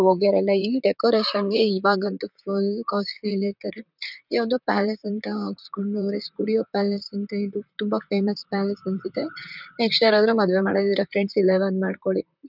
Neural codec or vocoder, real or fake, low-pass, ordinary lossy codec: vocoder, 44.1 kHz, 128 mel bands, Pupu-Vocoder; fake; 5.4 kHz; none